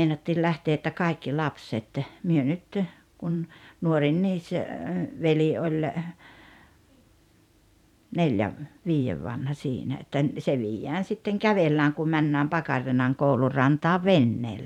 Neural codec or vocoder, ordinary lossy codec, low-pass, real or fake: vocoder, 44.1 kHz, 128 mel bands every 512 samples, BigVGAN v2; none; 19.8 kHz; fake